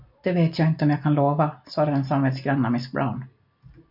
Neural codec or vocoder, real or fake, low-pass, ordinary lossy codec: none; real; 5.4 kHz; MP3, 48 kbps